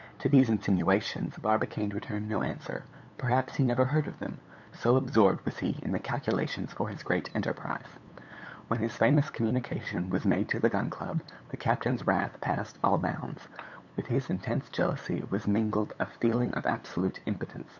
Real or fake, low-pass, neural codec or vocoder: fake; 7.2 kHz; codec, 16 kHz, 8 kbps, FunCodec, trained on LibriTTS, 25 frames a second